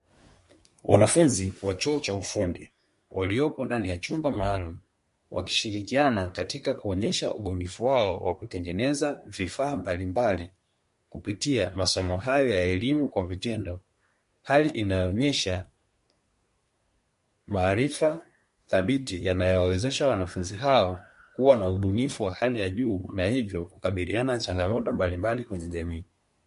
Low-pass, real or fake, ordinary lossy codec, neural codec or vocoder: 10.8 kHz; fake; MP3, 48 kbps; codec, 24 kHz, 1 kbps, SNAC